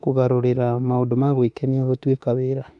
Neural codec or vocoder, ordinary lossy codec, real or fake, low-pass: autoencoder, 48 kHz, 32 numbers a frame, DAC-VAE, trained on Japanese speech; none; fake; 10.8 kHz